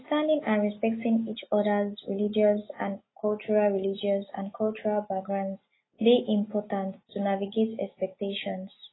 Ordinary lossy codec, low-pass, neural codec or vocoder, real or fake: AAC, 16 kbps; 7.2 kHz; none; real